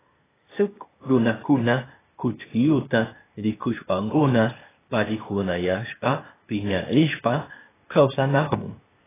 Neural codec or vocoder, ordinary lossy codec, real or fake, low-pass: codec, 16 kHz, 0.8 kbps, ZipCodec; AAC, 16 kbps; fake; 3.6 kHz